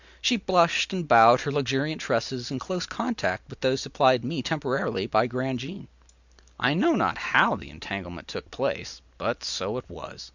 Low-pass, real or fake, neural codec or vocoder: 7.2 kHz; real; none